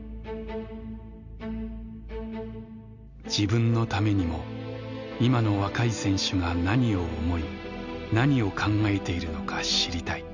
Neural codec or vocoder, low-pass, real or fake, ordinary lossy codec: none; 7.2 kHz; real; none